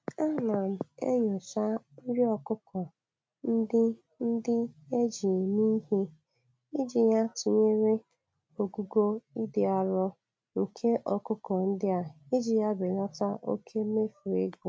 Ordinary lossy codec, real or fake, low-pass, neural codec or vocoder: none; real; none; none